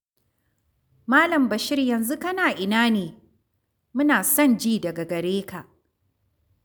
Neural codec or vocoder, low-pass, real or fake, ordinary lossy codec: none; none; real; none